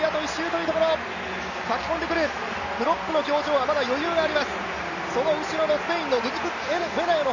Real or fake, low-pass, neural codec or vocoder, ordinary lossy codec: real; 7.2 kHz; none; none